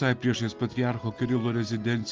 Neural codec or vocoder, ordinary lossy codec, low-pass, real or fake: none; Opus, 32 kbps; 7.2 kHz; real